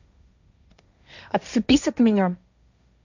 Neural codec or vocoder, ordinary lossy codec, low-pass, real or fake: codec, 16 kHz, 1.1 kbps, Voila-Tokenizer; none; 7.2 kHz; fake